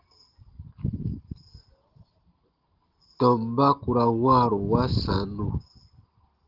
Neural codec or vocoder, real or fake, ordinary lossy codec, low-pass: none; real; Opus, 16 kbps; 5.4 kHz